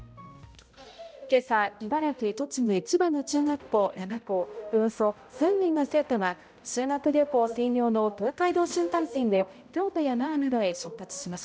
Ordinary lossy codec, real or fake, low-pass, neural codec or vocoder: none; fake; none; codec, 16 kHz, 0.5 kbps, X-Codec, HuBERT features, trained on balanced general audio